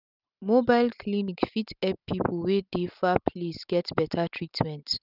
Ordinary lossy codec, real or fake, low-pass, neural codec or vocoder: none; real; 5.4 kHz; none